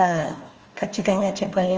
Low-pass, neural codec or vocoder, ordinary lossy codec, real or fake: 7.2 kHz; codec, 16 kHz, 1 kbps, FunCodec, trained on Chinese and English, 50 frames a second; Opus, 24 kbps; fake